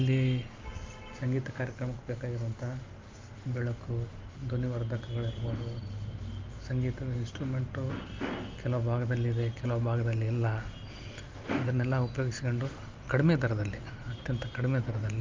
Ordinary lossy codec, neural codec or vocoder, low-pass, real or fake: Opus, 24 kbps; none; 7.2 kHz; real